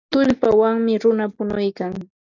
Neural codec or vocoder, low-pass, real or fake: none; 7.2 kHz; real